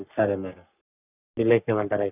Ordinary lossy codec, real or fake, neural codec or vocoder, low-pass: none; fake; codec, 44.1 kHz, 3.4 kbps, Pupu-Codec; 3.6 kHz